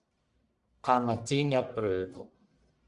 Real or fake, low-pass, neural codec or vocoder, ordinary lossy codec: fake; 10.8 kHz; codec, 44.1 kHz, 1.7 kbps, Pupu-Codec; Opus, 64 kbps